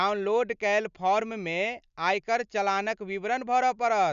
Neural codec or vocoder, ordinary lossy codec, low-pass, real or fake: none; none; 7.2 kHz; real